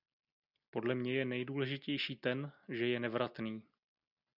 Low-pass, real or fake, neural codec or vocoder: 5.4 kHz; real; none